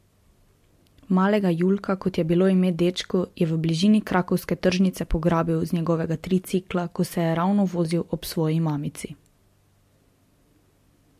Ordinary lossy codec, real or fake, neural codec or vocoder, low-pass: MP3, 64 kbps; real; none; 14.4 kHz